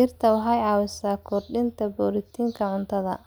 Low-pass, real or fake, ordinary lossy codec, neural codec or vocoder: none; real; none; none